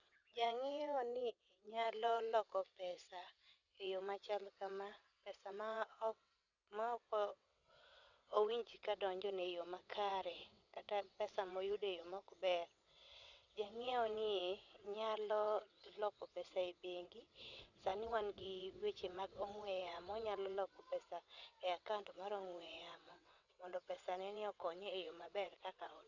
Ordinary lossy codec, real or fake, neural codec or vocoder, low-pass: none; fake; vocoder, 22.05 kHz, 80 mel bands, WaveNeXt; 7.2 kHz